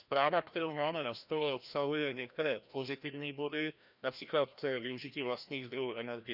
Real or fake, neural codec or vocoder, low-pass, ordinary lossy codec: fake; codec, 16 kHz, 1 kbps, FreqCodec, larger model; 5.4 kHz; none